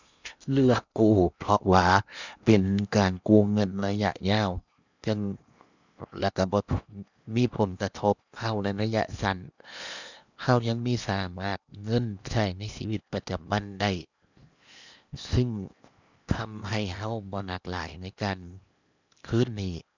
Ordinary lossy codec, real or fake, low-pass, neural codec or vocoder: none; fake; 7.2 kHz; codec, 16 kHz in and 24 kHz out, 0.8 kbps, FocalCodec, streaming, 65536 codes